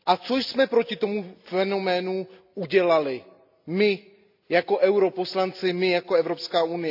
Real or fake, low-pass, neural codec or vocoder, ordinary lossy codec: real; 5.4 kHz; none; AAC, 48 kbps